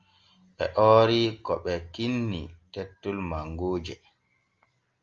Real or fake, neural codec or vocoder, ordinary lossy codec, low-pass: real; none; Opus, 32 kbps; 7.2 kHz